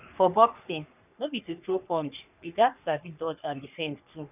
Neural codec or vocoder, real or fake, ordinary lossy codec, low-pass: codec, 44.1 kHz, 3.4 kbps, Pupu-Codec; fake; none; 3.6 kHz